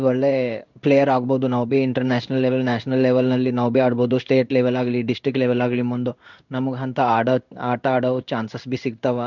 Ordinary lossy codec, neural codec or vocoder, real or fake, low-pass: none; codec, 16 kHz in and 24 kHz out, 1 kbps, XY-Tokenizer; fake; 7.2 kHz